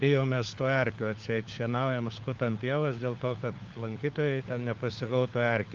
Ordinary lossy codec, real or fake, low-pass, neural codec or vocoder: Opus, 32 kbps; fake; 7.2 kHz; codec, 16 kHz, 4 kbps, FunCodec, trained on LibriTTS, 50 frames a second